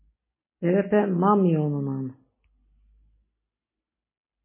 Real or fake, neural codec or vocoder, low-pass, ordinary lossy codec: real; none; 3.6 kHz; MP3, 16 kbps